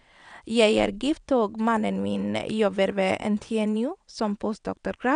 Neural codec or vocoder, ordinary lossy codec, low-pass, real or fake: vocoder, 22.05 kHz, 80 mel bands, WaveNeXt; none; 9.9 kHz; fake